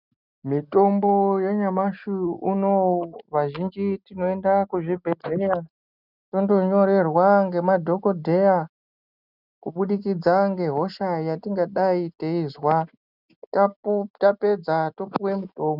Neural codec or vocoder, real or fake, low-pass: none; real; 5.4 kHz